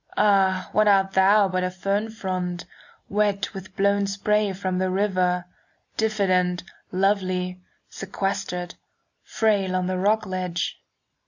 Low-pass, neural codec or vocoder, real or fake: 7.2 kHz; none; real